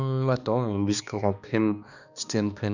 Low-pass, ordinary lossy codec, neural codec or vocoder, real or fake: 7.2 kHz; none; codec, 16 kHz, 2 kbps, X-Codec, HuBERT features, trained on balanced general audio; fake